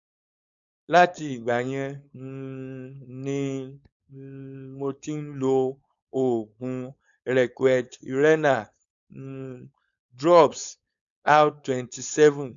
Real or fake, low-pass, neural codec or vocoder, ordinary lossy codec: fake; 7.2 kHz; codec, 16 kHz, 4.8 kbps, FACodec; none